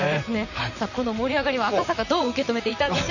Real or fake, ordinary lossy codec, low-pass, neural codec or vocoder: fake; none; 7.2 kHz; vocoder, 44.1 kHz, 128 mel bands, Pupu-Vocoder